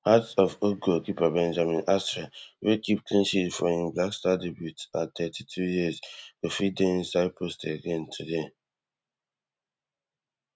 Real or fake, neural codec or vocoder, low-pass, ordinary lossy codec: real; none; none; none